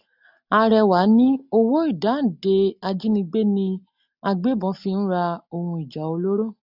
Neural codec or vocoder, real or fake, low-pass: none; real; 5.4 kHz